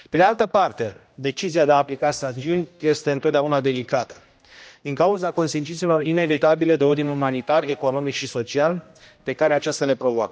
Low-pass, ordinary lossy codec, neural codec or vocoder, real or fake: none; none; codec, 16 kHz, 1 kbps, X-Codec, HuBERT features, trained on general audio; fake